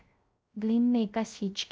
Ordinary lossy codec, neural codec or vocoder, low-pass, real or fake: none; codec, 16 kHz, 0.3 kbps, FocalCodec; none; fake